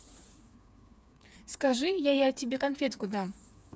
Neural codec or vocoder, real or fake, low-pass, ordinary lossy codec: codec, 16 kHz, 4 kbps, FreqCodec, smaller model; fake; none; none